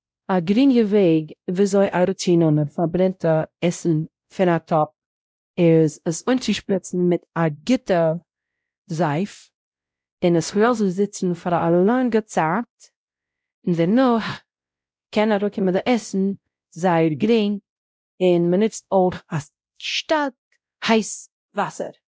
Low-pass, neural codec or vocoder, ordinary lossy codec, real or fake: none; codec, 16 kHz, 0.5 kbps, X-Codec, WavLM features, trained on Multilingual LibriSpeech; none; fake